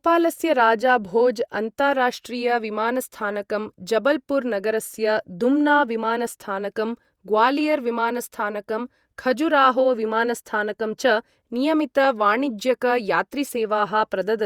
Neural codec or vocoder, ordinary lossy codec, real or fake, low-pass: vocoder, 48 kHz, 128 mel bands, Vocos; Opus, 64 kbps; fake; 19.8 kHz